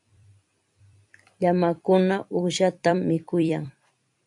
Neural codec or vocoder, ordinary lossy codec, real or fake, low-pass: vocoder, 44.1 kHz, 128 mel bands every 256 samples, BigVGAN v2; AAC, 64 kbps; fake; 10.8 kHz